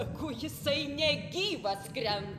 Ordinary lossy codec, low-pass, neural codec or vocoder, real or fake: AAC, 96 kbps; 14.4 kHz; none; real